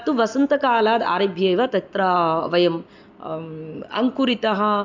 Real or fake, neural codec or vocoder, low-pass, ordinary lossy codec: real; none; 7.2 kHz; MP3, 64 kbps